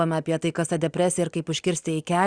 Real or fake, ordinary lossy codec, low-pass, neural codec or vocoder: real; Opus, 64 kbps; 9.9 kHz; none